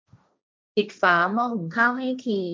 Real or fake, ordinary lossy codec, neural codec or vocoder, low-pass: fake; none; codec, 16 kHz, 1.1 kbps, Voila-Tokenizer; none